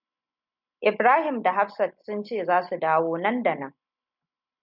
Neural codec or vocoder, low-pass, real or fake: none; 5.4 kHz; real